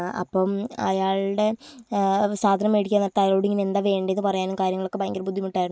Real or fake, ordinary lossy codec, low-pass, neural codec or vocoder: real; none; none; none